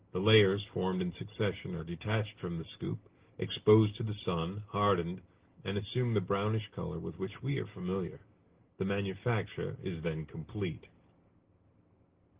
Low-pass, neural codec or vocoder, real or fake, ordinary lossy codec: 3.6 kHz; none; real; Opus, 16 kbps